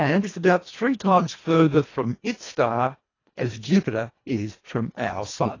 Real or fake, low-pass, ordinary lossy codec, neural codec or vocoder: fake; 7.2 kHz; AAC, 32 kbps; codec, 24 kHz, 1.5 kbps, HILCodec